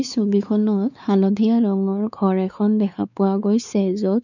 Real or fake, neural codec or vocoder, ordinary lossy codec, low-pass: fake; codec, 16 kHz, 4 kbps, X-Codec, WavLM features, trained on Multilingual LibriSpeech; none; 7.2 kHz